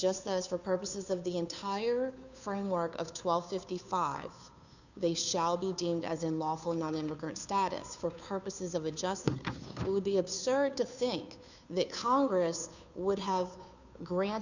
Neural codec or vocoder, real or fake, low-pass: codec, 16 kHz, 2 kbps, FunCodec, trained on Chinese and English, 25 frames a second; fake; 7.2 kHz